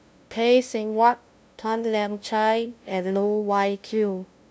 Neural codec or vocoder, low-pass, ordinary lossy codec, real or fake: codec, 16 kHz, 0.5 kbps, FunCodec, trained on LibriTTS, 25 frames a second; none; none; fake